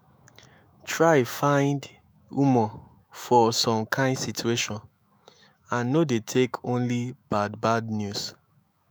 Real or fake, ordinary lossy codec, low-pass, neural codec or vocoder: fake; none; none; autoencoder, 48 kHz, 128 numbers a frame, DAC-VAE, trained on Japanese speech